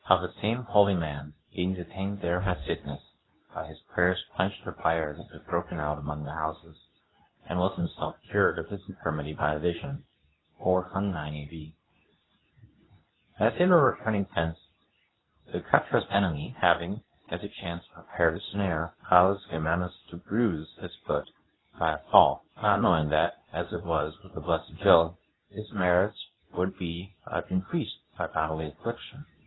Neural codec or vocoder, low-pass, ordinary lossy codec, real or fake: codec, 24 kHz, 0.9 kbps, WavTokenizer, medium speech release version 2; 7.2 kHz; AAC, 16 kbps; fake